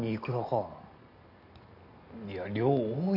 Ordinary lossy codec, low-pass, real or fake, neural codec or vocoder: none; 5.4 kHz; real; none